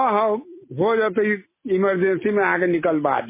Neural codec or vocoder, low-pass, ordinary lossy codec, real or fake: none; 3.6 kHz; MP3, 16 kbps; real